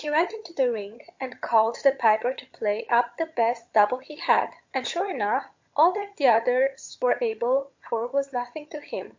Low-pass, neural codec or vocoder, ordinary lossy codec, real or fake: 7.2 kHz; vocoder, 22.05 kHz, 80 mel bands, HiFi-GAN; MP3, 48 kbps; fake